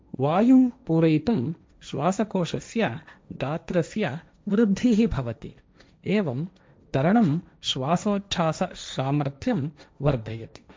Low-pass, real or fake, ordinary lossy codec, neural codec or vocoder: none; fake; none; codec, 16 kHz, 1.1 kbps, Voila-Tokenizer